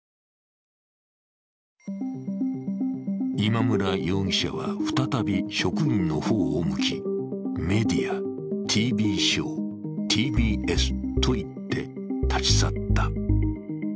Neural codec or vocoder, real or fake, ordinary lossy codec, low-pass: none; real; none; none